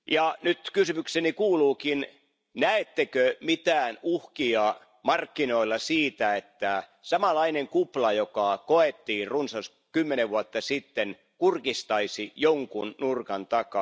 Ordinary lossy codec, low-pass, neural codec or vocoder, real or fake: none; none; none; real